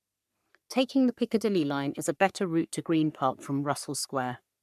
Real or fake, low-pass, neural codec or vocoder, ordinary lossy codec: fake; 14.4 kHz; codec, 44.1 kHz, 3.4 kbps, Pupu-Codec; none